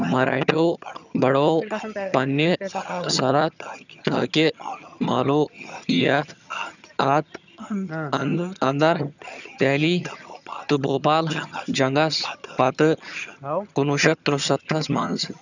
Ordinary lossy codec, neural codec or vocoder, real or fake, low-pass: none; vocoder, 22.05 kHz, 80 mel bands, HiFi-GAN; fake; 7.2 kHz